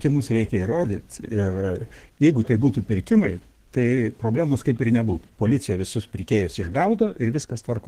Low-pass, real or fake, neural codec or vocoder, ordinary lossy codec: 14.4 kHz; fake; codec, 32 kHz, 1.9 kbps, SNAC; Opus, 16 kbps